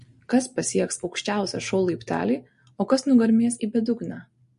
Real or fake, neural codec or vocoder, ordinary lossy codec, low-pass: real; none; MP3, 48 kbps; 10.8 kHz